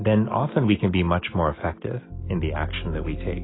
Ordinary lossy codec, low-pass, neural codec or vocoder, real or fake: AAC, 16 kbps; 7.2 kHz; none; real